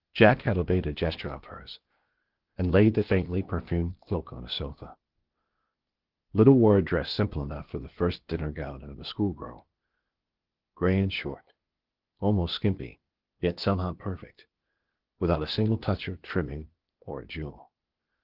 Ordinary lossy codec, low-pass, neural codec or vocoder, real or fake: Opus, 16 kbps; 5.4 kHz; codec, 16 kHz, 0.8 kbps, ZipCodec; fake